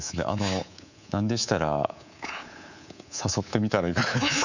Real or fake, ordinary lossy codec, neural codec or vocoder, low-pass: fake; none; codec, 24 kHz, 3.1 kbps, DualCodec; 7.2 kHz